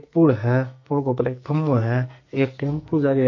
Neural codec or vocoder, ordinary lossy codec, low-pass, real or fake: codec, 44.1 kHz, 2.6 kbps, SNAC; MP3, 48 kbps; 7.2 kHz; fake